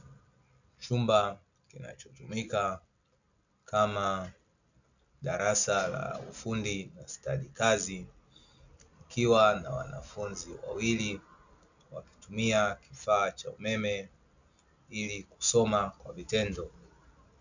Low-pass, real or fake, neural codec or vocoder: 7.2 kHz; real; none